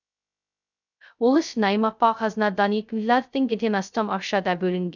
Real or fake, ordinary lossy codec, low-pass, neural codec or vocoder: fake; none; 7.2 kHz; codec, 16 kHz, 0.2 kbps, FocalCodec